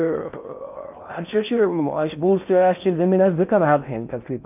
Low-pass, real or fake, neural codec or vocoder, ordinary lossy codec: 3.6 kHz; fake; codec, 16 kHz in and 24 kHz out, 0.6 kbps, FocalCodec, streaming, 4096 codes; none